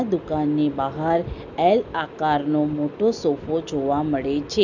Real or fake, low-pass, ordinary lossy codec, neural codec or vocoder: real; 7.2 kHz; none; none